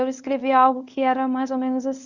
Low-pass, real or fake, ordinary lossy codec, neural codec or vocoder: 7.2 kHz; fake; none; codec, 24 kHz, 0.9 kbps, WavTokenizer, medium speech release version 1